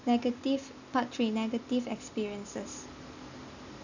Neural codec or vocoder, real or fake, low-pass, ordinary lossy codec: none; real; 7.2 kHz; none